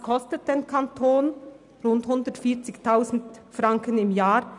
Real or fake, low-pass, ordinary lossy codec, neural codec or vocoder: real; 10.8 kHz; none; none